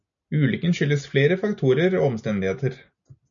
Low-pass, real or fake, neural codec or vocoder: 7.2 kHz; real; none